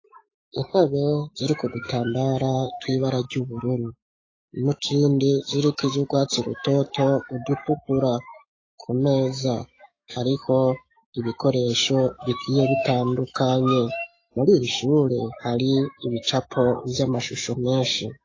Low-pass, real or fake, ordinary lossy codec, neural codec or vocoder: 7.2 kHz; fake; AAC, 32 kbps; autoencoder, 48 kHz, 128 numbers a frame, DAC-VAE, trained on Japanese speech